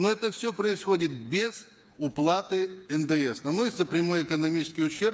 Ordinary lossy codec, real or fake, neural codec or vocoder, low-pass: none; fake; codec, 16 kHz, 4 kbps, FreqCodec, smaller model; none